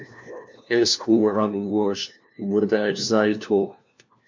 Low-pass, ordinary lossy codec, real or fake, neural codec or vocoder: 7.2 kHz; MP3, 64 kbps; fake; codec, 16 kHz, 1 kbps, FunCodec, trained on LibriTTS, 50 frames a second